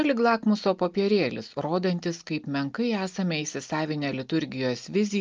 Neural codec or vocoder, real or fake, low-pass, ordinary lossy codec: none; real; 7.2 kHz; Opus, 32 kbps